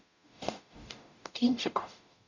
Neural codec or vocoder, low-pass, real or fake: codec, 44.1 kHz, 0.9 kbps, DAC; 7.2 kHz; fake